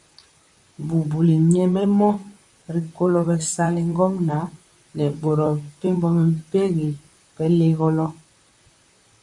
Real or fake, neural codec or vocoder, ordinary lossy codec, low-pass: fake; vocoder, 44.1 kHz, 128 mel bands, Pupu-Vocoder; MP3, 64 kbps; 10.8 kHz